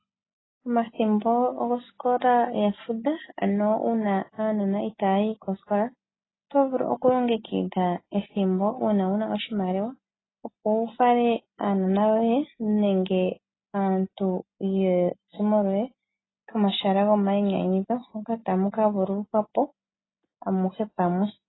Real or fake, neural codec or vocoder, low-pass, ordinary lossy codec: real; none; 7.2 kHz; AAC, 16 kbps